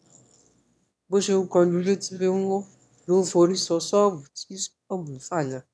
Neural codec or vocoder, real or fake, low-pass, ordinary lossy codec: autoencoder, 22.05 kHz, a latent of 192 numbers a frame, VITS, trained on one speaker; fake; none; none